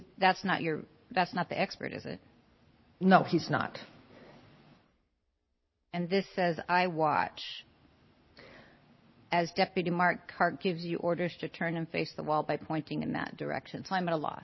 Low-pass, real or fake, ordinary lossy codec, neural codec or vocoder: 7.2 kHz; real; MP3, 24 kbps; none